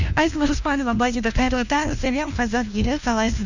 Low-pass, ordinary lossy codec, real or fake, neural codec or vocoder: 7.2 kHz; none; fake; codec, 16 kHz, 1 kbps, FunCodec, trained on LibriTTS, 50 frames a second